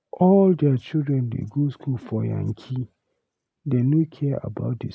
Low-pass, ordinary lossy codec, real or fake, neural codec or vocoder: none; none; real; none